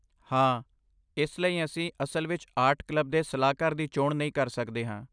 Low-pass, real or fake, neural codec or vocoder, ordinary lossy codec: 9.9 kHz; real; none; none